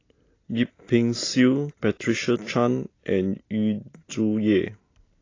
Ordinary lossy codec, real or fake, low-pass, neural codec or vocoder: AAC, 32 kbps; real; 7.2 kHz; none